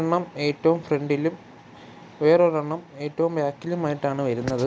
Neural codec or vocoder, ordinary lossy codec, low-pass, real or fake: none; none; none; real